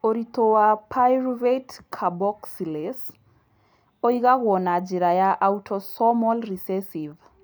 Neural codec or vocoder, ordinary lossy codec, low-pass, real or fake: none; none; none; real